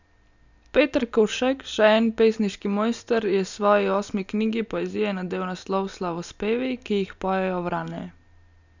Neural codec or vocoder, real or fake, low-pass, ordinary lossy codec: none; real; 7.2 kHz; none